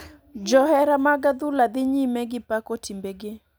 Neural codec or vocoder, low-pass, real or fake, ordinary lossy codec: none; none; real; none